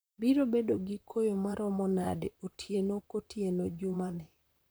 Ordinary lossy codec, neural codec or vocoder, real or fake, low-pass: none; vocoder, 44.1 kHz, 128 mel bands, Pupu-Vocoder; fake; none